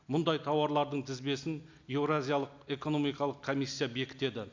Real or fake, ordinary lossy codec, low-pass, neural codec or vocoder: real; MP3, 64 kbps; 7.2 kHz; none